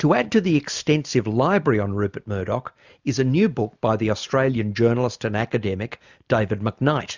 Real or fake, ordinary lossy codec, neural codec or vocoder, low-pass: real; Opus, 64 kbps; none; 7.2 kHz